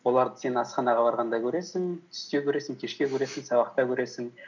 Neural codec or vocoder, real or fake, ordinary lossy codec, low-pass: none; real; none; 7.2 kHz